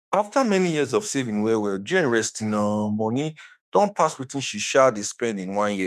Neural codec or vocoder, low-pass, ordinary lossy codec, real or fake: autoencoder, 48 kHz, 32 numbers a frame, DAC-VAE, trained on Japanese speech; 14.4 kHz; none; fake